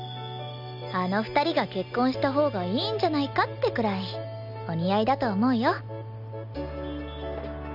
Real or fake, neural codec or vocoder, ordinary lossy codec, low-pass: real; none; none; 5.4 kHz